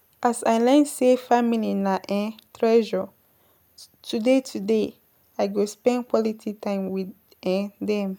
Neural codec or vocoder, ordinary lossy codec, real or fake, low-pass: none; none; real; none